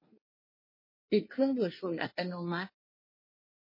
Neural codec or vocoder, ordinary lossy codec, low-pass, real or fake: codec, 24 kHz, 1 kbps, SNAC; MP3, 24 kbps; 5.4 kHz; fake